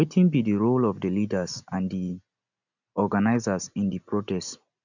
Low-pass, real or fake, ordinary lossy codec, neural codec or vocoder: 7.2 kHz; real; none; none